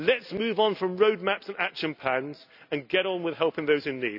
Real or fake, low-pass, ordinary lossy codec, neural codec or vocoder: real; 5.4 kHz; none; none